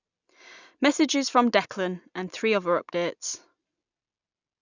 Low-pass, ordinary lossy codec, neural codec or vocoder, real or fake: 7.2 kHz; none; none; real